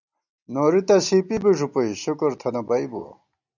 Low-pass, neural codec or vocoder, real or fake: 7.2 kHz; none; real